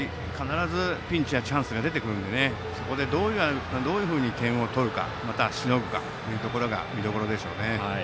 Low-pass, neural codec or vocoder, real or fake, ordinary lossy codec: none; none; real; none